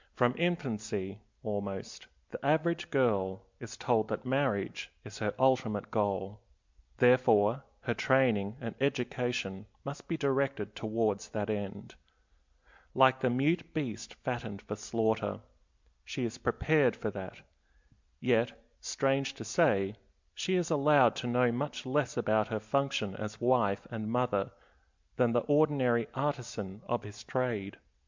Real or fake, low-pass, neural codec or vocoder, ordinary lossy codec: real; 7.2 kHz; none; MP3, 64 kbps